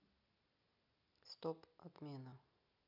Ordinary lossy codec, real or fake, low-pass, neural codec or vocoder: none; real; 5.4 kHz; none